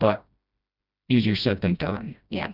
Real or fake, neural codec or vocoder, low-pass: fake; codec, 16 kHz, 1 kbps, FreqCodec, smaller model; 5.4 kHz